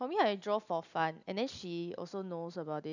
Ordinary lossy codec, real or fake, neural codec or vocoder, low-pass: none; real; none; 7.2 kHz